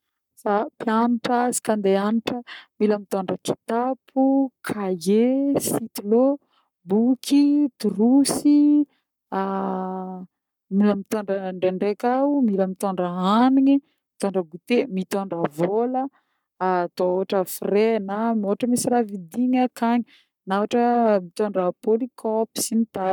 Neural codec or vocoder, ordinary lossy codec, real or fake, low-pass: codec, 44.1 kHz, 7.8 kbps, Pupu-Codec; none; fake; 19.8 kHz